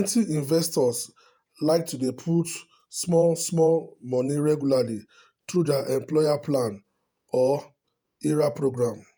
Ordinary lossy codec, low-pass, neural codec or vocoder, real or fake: none; none; vocoder, 48 kHz, 128 mel bands, Vocos; fake